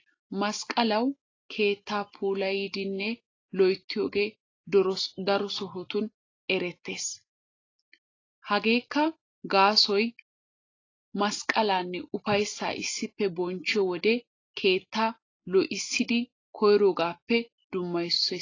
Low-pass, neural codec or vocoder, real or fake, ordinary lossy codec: 7.2 kHz; none; real; AAC, 32 kbps